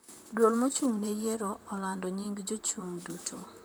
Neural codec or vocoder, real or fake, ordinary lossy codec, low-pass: vocoder, 44.1 kHz, 128 mel bands, Pupu-Vocoder; fake; none; none